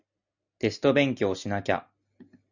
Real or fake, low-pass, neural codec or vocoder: real; 7.2 kHz; none